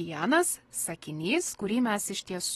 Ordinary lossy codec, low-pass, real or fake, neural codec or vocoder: AAC, 32 kbps; 19.8 kHz; real; none